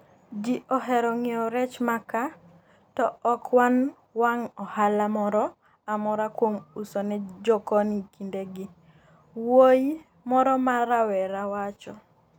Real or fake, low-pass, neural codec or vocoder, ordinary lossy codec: real; none; none; none